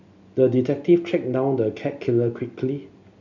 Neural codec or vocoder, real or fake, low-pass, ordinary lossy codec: none; real; 7.2 kHz; none